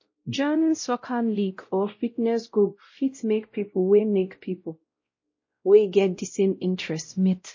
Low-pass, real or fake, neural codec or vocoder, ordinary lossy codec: 7.2 kHz; fake; codec, 16 kHz, 0.5 kbps, X-Codec, WavLM features, trained on Multilingual LibriSpeech; MP3, 32 kbps